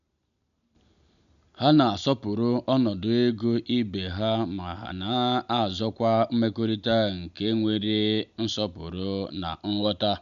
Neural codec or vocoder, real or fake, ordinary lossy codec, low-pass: none; real; none; 7.2 kHz